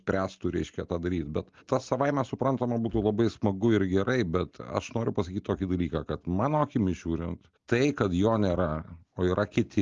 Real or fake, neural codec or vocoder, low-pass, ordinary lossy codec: real; none; 7.2 kHz; Opus, 32 kbps